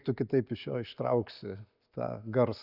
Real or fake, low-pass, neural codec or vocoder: real; 5.4 kHz; none